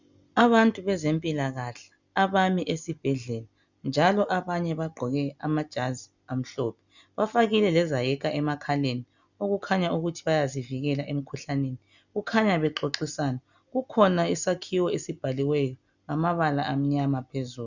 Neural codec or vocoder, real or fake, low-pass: none; real; 7.2 kHz